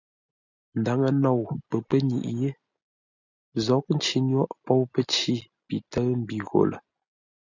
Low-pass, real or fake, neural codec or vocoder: 7.2 kHz; real; none